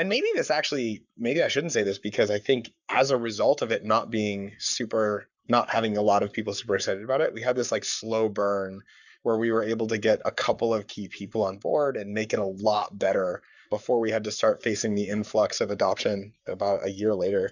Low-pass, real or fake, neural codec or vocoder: 7.2 kHz; fake; codec, 44.1 kHz, 7.8 kbps, Pupu-Codec